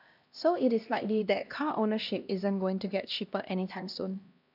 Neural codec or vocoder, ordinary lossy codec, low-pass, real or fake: codec, 16 kHz, 1 kbps, X-Codec, HuBERT features, trained on LibriSpeech; none; 5.4 kHz; fake